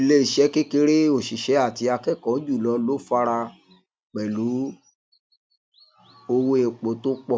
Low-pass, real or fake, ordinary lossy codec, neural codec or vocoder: none; real; none; none